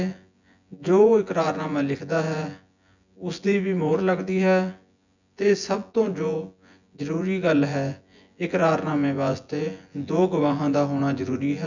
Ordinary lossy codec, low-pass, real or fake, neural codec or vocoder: none; 7.2 kHz; fake; vocoder, 24 kHz, 100 mel bands, Vocos